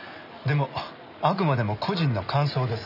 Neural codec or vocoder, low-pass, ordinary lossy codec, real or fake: none; 5.4 kHz; none; real